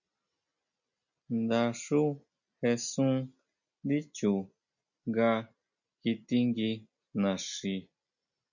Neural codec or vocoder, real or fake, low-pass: none; real; 7.2 kHz